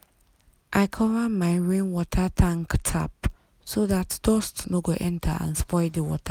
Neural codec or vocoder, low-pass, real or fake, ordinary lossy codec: none; 19.8 kHz; real; none